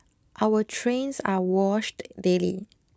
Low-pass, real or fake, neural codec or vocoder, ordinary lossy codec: none; real; none; none